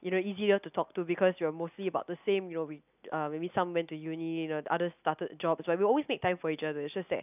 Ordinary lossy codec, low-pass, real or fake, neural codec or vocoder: none; 3.6 kHz; real; none